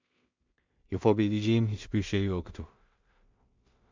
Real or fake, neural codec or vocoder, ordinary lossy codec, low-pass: fake; codec, 16 kHz in and 24 kHz out, 0.4 kbps, LongCat-Audio-Codec, two codebook decoder; MP3, 64 kbps; 7.2 kHz